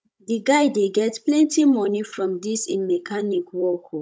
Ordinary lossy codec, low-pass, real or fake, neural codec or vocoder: none; none; fake; codec, 16 kHz, 16 kbps, FunCodec, trained on Chinese and English, 50 frames a second